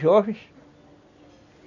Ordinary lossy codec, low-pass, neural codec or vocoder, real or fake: none; 7.2 kHz; none; real